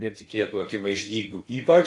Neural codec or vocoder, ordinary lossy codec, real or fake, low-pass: codec, 16 kHz in and 24 kHz out, 0.8 kbps, FocalCodec, streaming, 65536 codes; AAC, 48 kbps; fake; 10.8 kHz